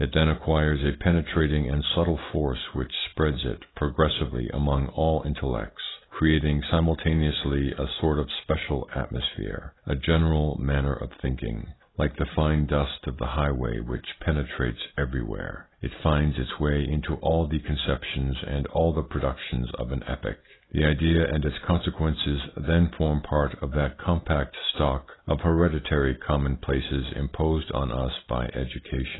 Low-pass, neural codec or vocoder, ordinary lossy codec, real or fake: 7.2 kHz; none; AAC, 16 kbps; real